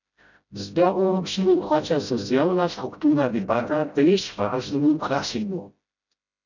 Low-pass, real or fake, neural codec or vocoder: 7.2 kHz; fake; codec, 16 kHz, 0.5 kbps, FreqCodec, smaller model